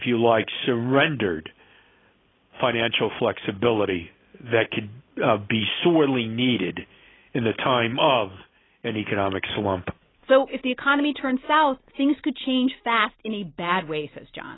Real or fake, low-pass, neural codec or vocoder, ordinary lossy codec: real; 7.2 kHz; none; AAC, 16 kbps